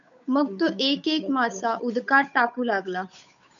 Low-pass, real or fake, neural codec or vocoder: 7.2 kHz; fake; codec, 16 kHz, 8 kbps, FunCodec, trained on Chinese and English, 25 frames a second